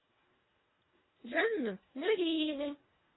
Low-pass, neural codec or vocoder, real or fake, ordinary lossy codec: 7.2 kHz; codec, 24 kHz, 1.5 kbps, HILCodec; fake; AAC, 16 kbps